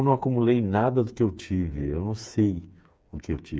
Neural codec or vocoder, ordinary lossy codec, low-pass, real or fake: codec, 16 kHz, 4 kbps, FreqCodec, smaller model; none; none; fake